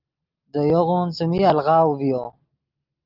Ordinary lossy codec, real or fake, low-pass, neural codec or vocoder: Opus, 32 kbps; real; 5.4 kHz; none